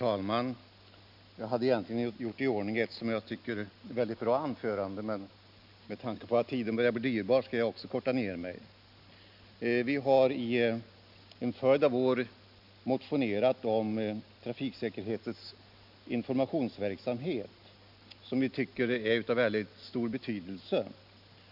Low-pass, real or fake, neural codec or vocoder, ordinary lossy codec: 5.4 kHz; real; none; none